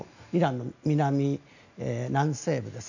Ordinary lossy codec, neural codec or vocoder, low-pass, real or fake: none; none; 7.2 kHz; real